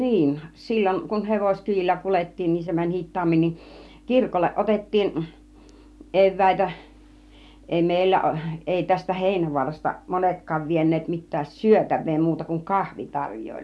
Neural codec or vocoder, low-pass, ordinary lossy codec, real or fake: none; none; none; real